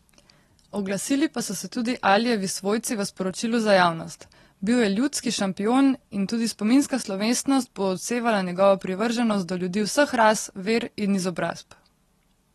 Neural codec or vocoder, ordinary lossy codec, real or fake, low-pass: none; AAC, 32 kbps; real; 19.8 kHz